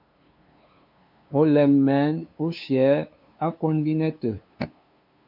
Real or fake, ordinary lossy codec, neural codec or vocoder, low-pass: fake; MP3, 48 kbps; codec, 16 kHz, 2 kbps, FunCodec, trained on LibriTTS, 25 frames a second; 5.4 kHz